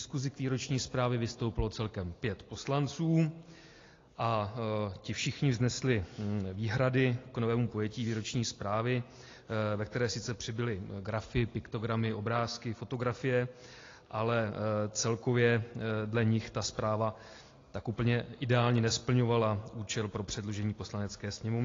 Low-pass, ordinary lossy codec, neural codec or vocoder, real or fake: 7.2 kHz; AAC, 32 kbps; none; real